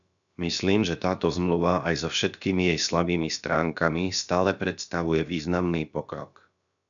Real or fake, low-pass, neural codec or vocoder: fake; 7.2 kHz; codec, 16 kHz, about 1 kbps, DyCAST, with the encoder's durations